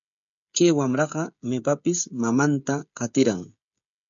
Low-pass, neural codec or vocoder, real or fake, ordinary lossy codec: 7.2 kHz; codec, 16 kHz, 16 kbps, FreqCodec, smaller model; fake; MP3, 64 kbps